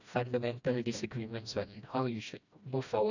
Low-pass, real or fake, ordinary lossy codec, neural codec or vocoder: 7.2 kHz; fake; none; codec, 16 kHz, 1 kbps, FreqCodec, smaller model